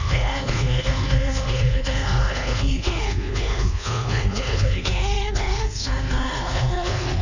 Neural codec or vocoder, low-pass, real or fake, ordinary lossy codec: codec, 24 kHz, 1.2 kbps, DualCodec; 7.2 kHz; fake; none